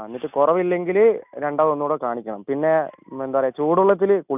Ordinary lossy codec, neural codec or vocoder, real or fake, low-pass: none; none; real; 3.6 kHz